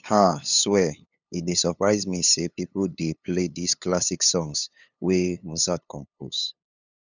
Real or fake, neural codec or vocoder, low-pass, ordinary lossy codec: fake; codec, 16 kHz, 8 kbps, FunCodec, trained on LibriTTS, 25 frames a second; 7.2 kHz; none